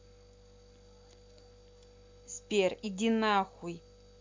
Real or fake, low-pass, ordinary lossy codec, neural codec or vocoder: real; 7.2 kHz; MP3, 64 kbps; none